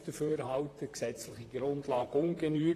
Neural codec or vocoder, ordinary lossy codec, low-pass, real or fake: vocoder, 44.1 kHz, 128 mel bands, Pupu-Vocoder; AAC, 48 kbps; 14.4 kHz; fake